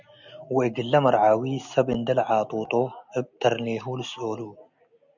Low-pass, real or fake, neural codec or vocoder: 7.2 kHz; real; none